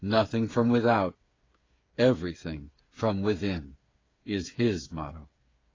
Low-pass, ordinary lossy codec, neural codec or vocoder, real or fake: 7.2 kHz; AAC, 32 kbps; codec, 16 kHz, 8 kbps, FreqCodec, smaller model; fake